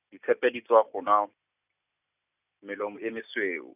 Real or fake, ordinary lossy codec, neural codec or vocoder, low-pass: real; none; none; 3.6 kHz